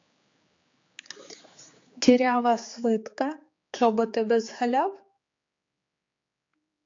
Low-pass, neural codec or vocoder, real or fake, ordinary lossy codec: 7.2 kHz; codec, 16 kHz, 4 kbps, X-Codec, HuBERT features, trained on general audio; fake; AAC, 48 kbps